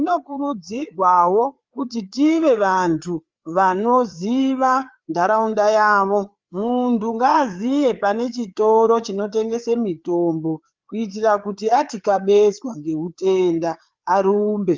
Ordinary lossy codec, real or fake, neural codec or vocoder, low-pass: Opus, 32 kbps; fake; codec, 16 kHz, 4 kbps, FreqCodec, larger model; 7.2 kHz